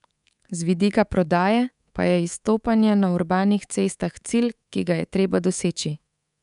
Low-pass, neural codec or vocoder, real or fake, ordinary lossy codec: 10.8 kHz; codec, 24 kHz, 3.1 kbps, DualCodec; fake; none